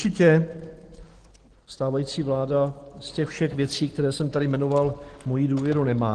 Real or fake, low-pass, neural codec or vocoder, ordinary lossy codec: real; 9.9 kHz; none; Opus, 16 kbps